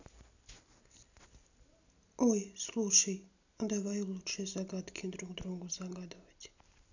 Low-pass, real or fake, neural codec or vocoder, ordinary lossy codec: 7.2 kHz; real; none; none